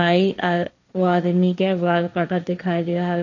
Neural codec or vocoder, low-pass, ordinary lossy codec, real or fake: codec, 16 kHz, 1.1 kbps, Voila-Tokenizer; 7.2 kHz; none; fake